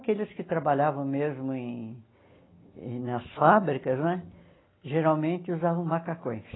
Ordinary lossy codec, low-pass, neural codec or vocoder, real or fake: AAC, 16 kbps; 7.2 kHz; none; real